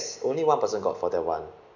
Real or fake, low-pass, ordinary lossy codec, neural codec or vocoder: real; 7.2 kHz; none; none